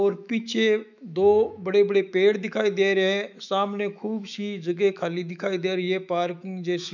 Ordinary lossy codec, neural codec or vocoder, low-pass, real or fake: none; autoencoder, 48 kHz, 128 numbers a frame, DAC-VAE, trained on Japanese speech; 7.2 kHz; fake